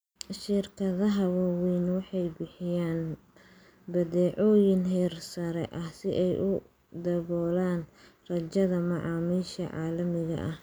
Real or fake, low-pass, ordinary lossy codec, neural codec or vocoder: real; none; none; none